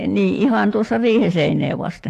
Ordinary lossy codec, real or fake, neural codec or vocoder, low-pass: AAC, 48 kbps; fake; autoencoder, 48 kHz, 128 numbers a frame, DAC-VAE, trained on Japanese speech; 14.4 kHz